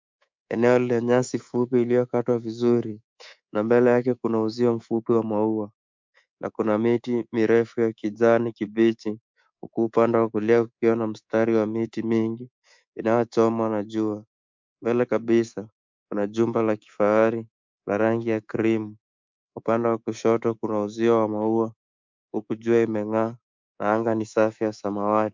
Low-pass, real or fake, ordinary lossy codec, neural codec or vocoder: 7.2 kHz; fake; MP3, 64 kbps; codec, 24 kHz, 3.1 kbps, DualCodec